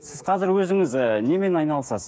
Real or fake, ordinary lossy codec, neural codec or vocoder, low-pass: fake; none; codec, 16 kHz, 8 kbps, FreqCodec, smaller model; none